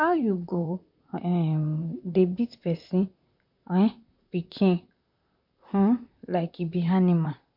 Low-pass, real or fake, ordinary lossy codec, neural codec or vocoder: 5.4 kHz; fake; MP3, 48 kbps; vocoder, 44.1 kHz, 128 mel bands, Pupu-Vocoder